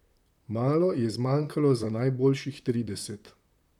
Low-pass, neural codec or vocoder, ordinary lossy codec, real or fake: 19.8 kHz; vocoder, 44.1 kHz, 128 mel bands, Pupu-Vocoder; none; fake